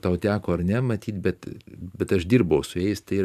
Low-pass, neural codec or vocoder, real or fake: 14.4 kHz; vocoder, 44.1 kHz, 128 mel bands every 512 samples, BigVGAN v2; fake